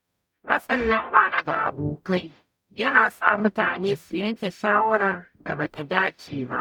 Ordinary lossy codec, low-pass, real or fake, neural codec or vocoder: none; 19.8 kHz; fake; codec, 44.1 kHz, 0.9 kbps, DAC